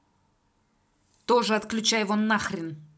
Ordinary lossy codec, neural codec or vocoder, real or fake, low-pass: none; none; real; none